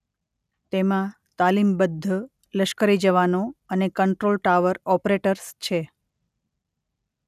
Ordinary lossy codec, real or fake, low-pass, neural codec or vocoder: none; real; 14.4 kHz; none